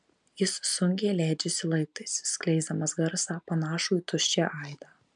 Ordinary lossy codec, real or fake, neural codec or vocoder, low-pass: MP3, 96 kbps; real; none; 9.9 kHz